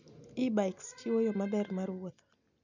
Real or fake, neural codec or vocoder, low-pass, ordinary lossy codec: real; none; 7.2 kHz; none